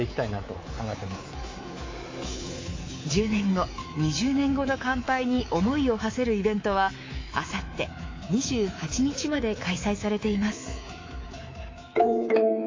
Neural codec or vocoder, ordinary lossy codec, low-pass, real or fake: codec, 24 kHz, 3.1 kbps, DualCodec; AAC, 32 kbps; 7.2 kHz; fake